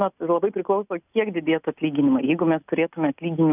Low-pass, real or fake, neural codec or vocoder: 3.6 kHz; real; none